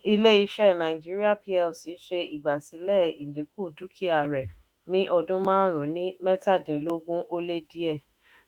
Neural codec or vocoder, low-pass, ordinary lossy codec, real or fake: autoencoder, 48 kHz, 32 numbers a frame, DAC-VAE, trained on Japanese speech; 19.8 kHz; Opus, 64 kbps; fake